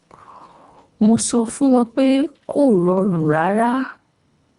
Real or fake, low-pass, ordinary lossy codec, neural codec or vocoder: fake; 10.8 kHz; Opus, 64 kbps; codec, 24 kHz, 1.5 kbps, HILCodec